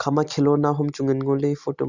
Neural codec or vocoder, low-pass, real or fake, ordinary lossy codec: none; 7.2 kHz; real; Opus, 64 kbps